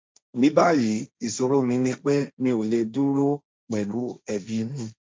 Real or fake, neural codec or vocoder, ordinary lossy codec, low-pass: fake; codec, 16 kHz, 1.1 kbps, Voila-Tokenizer; none; none